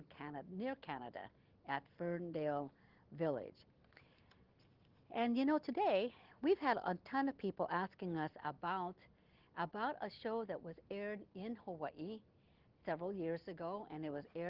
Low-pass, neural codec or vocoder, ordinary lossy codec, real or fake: 5.4 kHz; none; Opus, 16 kbps; real